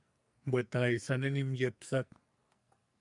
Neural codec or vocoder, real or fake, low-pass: codec, 44.1 kHz, 2.6 kbps, SNAC; fake; 10.8 kHz